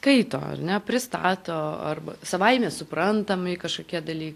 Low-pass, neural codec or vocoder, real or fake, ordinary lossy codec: 14.4 kHz; none; real; AAC, 64 kbps